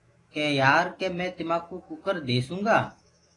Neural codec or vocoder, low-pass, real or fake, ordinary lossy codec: autoencoder, 48 kHz, 128 numbers a frame, DAC-VAE, trained on Japanese speech; 10.8 kHz; fake; AAC, 32 kbps